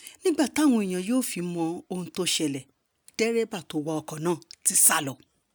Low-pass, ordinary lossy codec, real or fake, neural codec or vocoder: none; none; real; none